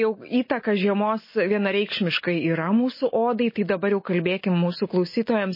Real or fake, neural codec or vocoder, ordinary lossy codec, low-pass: real; none; MP3, 24 kbps; 5.4 kHz